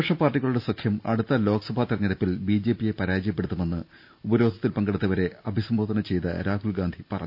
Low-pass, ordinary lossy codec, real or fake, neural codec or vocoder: 5.4 kHz; none; real; none